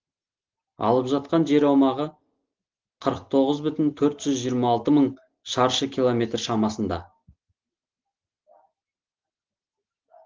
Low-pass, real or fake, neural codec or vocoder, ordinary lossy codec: 7.2 kHz; real; none; Opus, 16 kbps